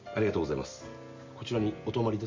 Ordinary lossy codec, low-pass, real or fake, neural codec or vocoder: MP3, 64 kbps; 7.2 kHz; real; none